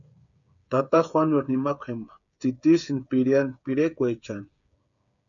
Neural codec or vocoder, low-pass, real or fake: codec, 16 kHz, 8 kbps, FreqCodec, smaller model; 7.2 kHz; fake